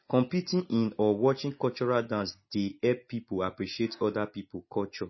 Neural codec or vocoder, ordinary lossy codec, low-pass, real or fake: none; MP3, 24 kbps; 7.2 kHz; real